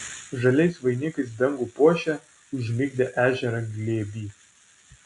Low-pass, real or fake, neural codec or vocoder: 10.8 kHz; real; none